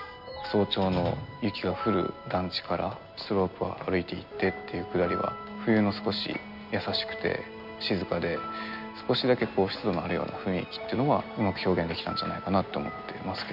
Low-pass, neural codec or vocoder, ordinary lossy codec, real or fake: 5.4 kHz; none; none; real